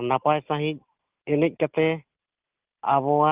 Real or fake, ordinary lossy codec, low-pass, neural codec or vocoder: real; Opus, 24 kbps; 3.6 kHz; none